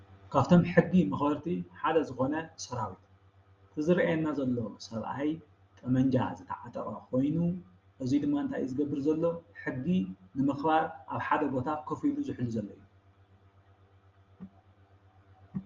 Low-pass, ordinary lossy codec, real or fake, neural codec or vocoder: 7.2 kHz; Opus, 32 kbps; real; none